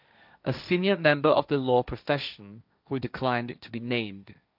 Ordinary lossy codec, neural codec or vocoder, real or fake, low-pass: none; codec, 16 kHz, 1.1 kbps, Voila-Tokenizer; fake; 5.4 kHz